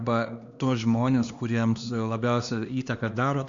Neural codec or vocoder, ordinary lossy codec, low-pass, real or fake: codec, 16 kHz, 2 kbps, X-Codec, HuBERT features, trained on LibriSpeech; Opus, 64 kbps; 7.2 kHz; fake